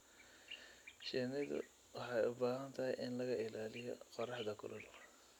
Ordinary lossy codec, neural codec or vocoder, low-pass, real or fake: none; none; 19.8 kHz; real